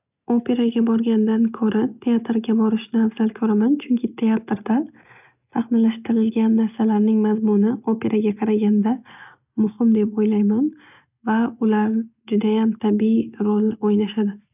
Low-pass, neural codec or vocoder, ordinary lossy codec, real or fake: 3.6 kHz; none; none; real